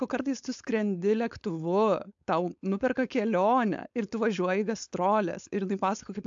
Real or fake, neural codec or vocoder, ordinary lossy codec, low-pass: fake; codec, 16 kHz, 4.8 kbps, FACodec; AAC, 64 kbps; 7.2 kHz